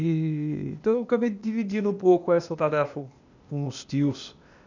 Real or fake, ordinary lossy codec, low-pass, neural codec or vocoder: fake; none; 7.2 kHz; codec, 16 kHz, 0.8 kbps, ZipCodec